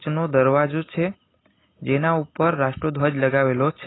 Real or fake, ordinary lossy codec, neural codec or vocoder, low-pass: real; AAC, 16 kbps; none; 7.2 kHz